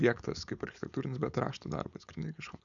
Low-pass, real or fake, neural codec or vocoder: 7.2 kHz; fake; codec, 16 kHz, 4.8 kbps, FACodec